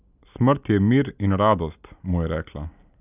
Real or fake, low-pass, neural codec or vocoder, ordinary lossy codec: real; 3.6 kHz; none; none